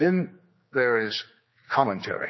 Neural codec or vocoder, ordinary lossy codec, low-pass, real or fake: codec, 16 kHz, 4 kbps, X-Codec, HuBERT features, trained on general audio; MP3, 24 kbps; 7.2 kHz; fake